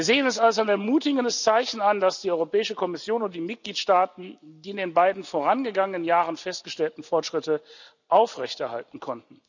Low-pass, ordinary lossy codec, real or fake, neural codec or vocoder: 7.2 kHz; none; real; none